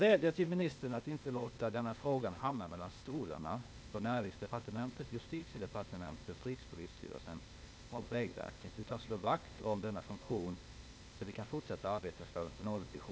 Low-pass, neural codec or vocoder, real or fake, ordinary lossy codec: none; codec, 16 kHz, 0.8 kbps, ZipCodec; fake; none